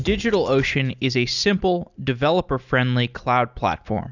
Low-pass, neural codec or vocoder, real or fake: 7.2 kHz; none; real